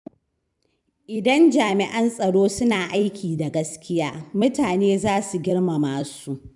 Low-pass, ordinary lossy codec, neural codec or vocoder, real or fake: 10.8 kHz; none; vocoder, 44.1 kHz, 128 mel bands every 256 samples, BigVGAN v2; fake